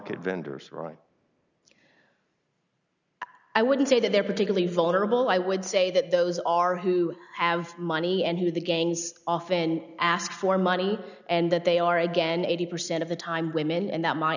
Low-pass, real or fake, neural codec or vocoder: 7.2 kHz; real; none